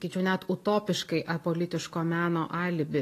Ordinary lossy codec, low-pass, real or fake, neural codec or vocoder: AAC, 48 kbps; 14.4 kHz; real; none